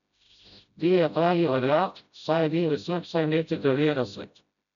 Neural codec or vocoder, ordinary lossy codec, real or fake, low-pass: codec, 16 kHz, 0.5 kbps, FreqCodec, smaller model; none; fake; 7.2 kHz